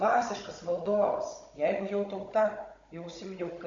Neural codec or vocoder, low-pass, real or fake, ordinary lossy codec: codec, 16 kHz, 16 kbps, FunCodec, trained on Chinese and English, 50 frames a second; 7.2 kHz; fake; AAC, 48 kbps